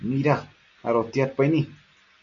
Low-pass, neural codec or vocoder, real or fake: 7.2 kHz; none; real